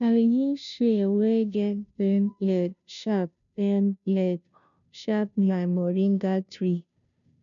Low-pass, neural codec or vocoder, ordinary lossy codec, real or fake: 7.2 kHz; codec, 16 kHz, 0.5 kbps, FunCodec, trained on Chinese and English, 25 frames a second; none; fake